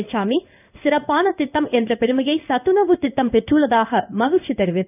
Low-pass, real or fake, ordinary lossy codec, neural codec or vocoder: 3.6 kHz; fake; none; codec, 16 kHz in and 24 kHz out, 1 kbps, XY-Tokenizer